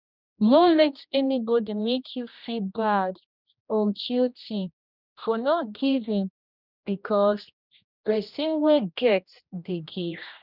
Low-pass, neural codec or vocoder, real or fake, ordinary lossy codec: 5.4 kHz; codec, 16 kHz, 1 kbps, X-Codec, HuBERT features, trained on general audio; fake; none